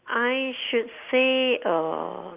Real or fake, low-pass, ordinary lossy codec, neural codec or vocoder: real; 3.6 kHz; Opus, 32 kbps; none